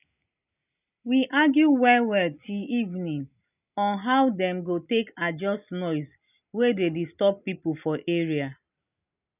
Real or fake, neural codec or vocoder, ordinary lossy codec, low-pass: real; none; none; 3.6 kHz